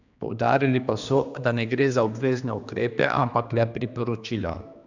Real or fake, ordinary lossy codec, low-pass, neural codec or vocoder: fake; none; 7.2 kHz; codec, 16 kHz, 2 kbps, X-Codec, HuBERT features, trained on general audio